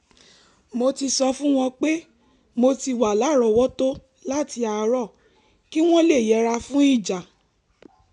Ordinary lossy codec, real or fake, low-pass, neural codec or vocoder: none; real; 10.8 kHz; none